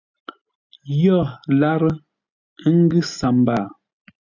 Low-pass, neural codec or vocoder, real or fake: 7.2 kHz; none; real